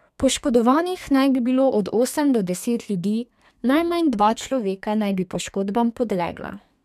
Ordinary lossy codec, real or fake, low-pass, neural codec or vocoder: none; fake; 14.4 kHz; codec, 32 kHz, 1.9 kbps, SNAC